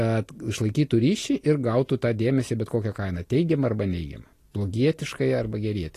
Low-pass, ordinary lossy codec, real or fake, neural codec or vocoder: 14.4 kHz; AAC, 48 kbps; real; none